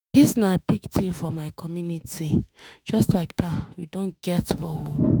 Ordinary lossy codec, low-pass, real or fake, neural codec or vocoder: none; none; fake; autoencoder, 48 kHz, 32 numbers a frame, DAC-VAE, trained on Japanese speech